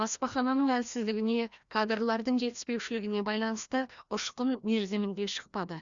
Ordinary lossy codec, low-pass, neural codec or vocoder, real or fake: Opus, 64 kbps; 7.2 kHz; codec, 16 kHz, 1 kbps, FreqCodec, larger model; fake